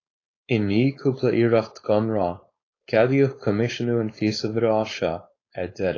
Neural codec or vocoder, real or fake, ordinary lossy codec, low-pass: codec, 16 kHz, 4.8 kbps, FACodec; fake; AAC, 32 kbps; 7.2 kHz